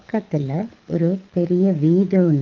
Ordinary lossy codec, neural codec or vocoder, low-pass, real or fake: Opus, 32 kbps; codec, 24 kHz, 6 kbps, HILCodec; 7.2 kHz; fake